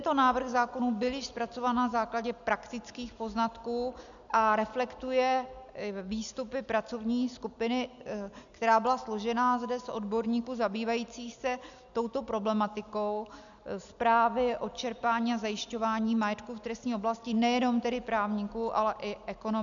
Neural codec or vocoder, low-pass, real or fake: none; 7.2 kHz; real